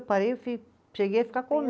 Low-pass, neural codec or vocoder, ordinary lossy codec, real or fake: none; none; none; real